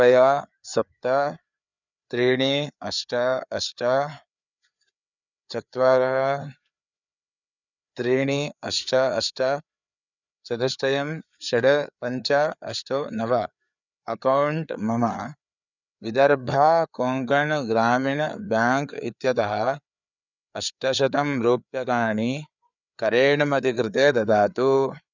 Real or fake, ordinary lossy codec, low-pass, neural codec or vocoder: fake; none; 7.2 kHz; codec, 16 kHz, 4 kbps, FreqCodec, larger model